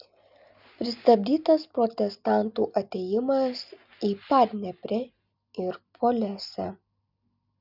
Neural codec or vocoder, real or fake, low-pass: none; real; 5.4 kHz